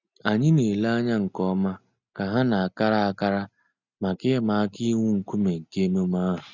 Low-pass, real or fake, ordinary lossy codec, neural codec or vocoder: 7.2 kHz; real; none; none